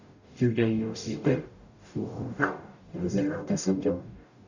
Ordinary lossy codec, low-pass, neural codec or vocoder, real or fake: none; 7.2 kHz; codec, 44.1 kHz, 0.9 kbps, DAC; fake